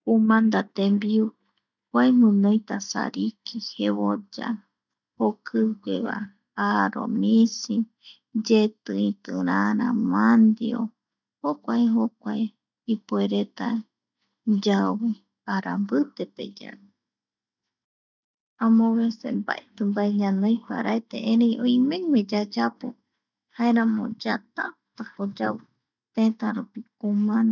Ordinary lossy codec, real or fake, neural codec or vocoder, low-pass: none; real; none; 7.2 kHz